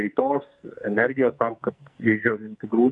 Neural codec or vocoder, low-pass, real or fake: codec, 44.1 kHz, 2.6 kbps, SNAC; 10.8 kHz; fake